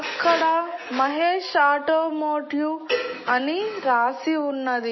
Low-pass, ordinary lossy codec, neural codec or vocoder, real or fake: 7.2 kHz; MP3, 24 kbps; none; real